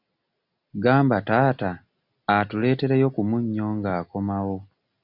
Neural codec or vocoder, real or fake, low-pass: none; real; 5.4 kHz